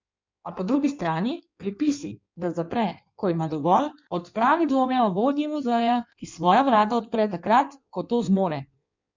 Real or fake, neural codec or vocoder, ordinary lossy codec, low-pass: fake; codec, 16 kHz in and 24 kHz out, 1.1 kbps, FireRedTTS-2 codec; MP3, 64 kbps; 7.2 kHz